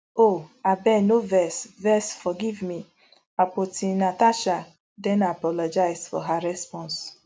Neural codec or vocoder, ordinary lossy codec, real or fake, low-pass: none; none; real; none